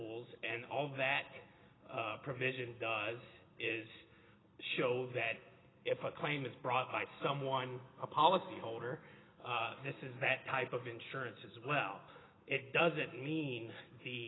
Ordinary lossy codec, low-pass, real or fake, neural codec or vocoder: AAC, 16 kbps; 7.2 kHz; real; none